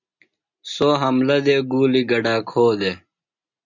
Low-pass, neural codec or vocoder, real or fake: 7.2 kHz; none; real